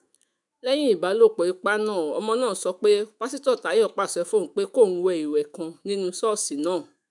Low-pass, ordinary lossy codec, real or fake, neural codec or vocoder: 10.8 kHz; none; fake; autoencoder, 48 kHz, 128 numbers a frame, DAC-VAE, trained on Japanese speech